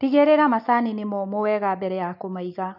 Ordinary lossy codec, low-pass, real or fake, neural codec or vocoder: none; 5.4 kHz; real; none